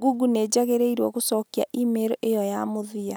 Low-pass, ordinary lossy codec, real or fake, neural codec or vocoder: none; none; real; none